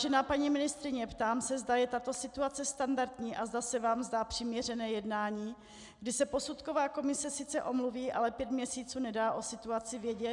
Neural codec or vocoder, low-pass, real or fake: none; 10.8 kHz; real